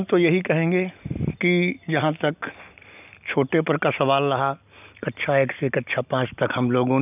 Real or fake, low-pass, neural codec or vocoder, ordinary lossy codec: real; 3.6 kHz; none; none